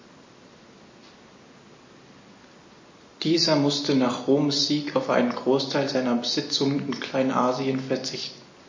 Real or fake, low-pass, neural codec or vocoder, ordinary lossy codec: real; 7.2 kHz; none; MP3, 32 kbps